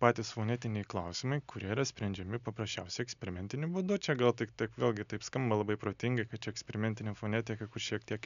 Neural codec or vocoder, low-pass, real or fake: none; 7.2 kHz; real